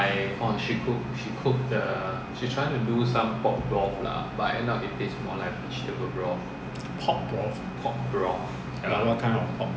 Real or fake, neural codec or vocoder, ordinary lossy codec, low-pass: real; none; none; none